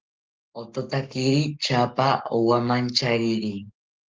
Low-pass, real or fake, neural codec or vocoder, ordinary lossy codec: 7.2 kHz; fake; codec, 44.1 kHz, 7.8 kbps, Pupu-Codec; Opus, 24 kbps